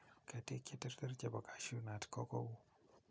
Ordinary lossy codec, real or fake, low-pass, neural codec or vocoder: none; real; none; none